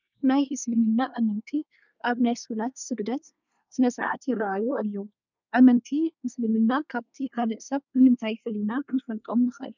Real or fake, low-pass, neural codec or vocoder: fake; 7.2 kHz; codec, 24 kHz, 1 kbps, SNAC